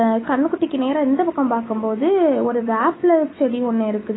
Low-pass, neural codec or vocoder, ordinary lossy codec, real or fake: 7.2 kHz; autoencoder, 48 kHz, 128 numbers a frame, DAC-VAE, trained on Japanese speech; AAC, 16 kbps; fake